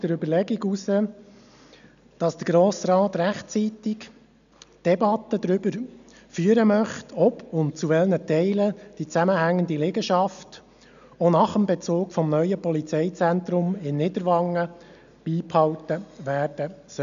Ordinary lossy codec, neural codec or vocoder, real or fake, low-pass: none; none; real; 7.2 kHz